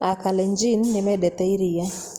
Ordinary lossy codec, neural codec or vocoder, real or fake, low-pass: Opus, 24 kbps; none; real; 19.8 kHz